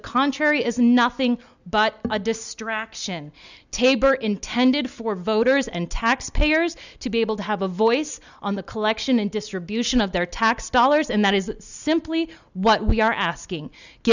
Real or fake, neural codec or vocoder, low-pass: real; none; 7.2 kHz